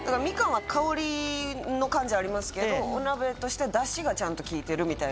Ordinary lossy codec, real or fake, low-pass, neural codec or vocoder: none; real; none; none